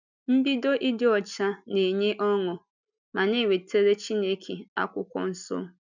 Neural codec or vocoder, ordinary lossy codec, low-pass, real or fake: none; none; 7.2 kHz; real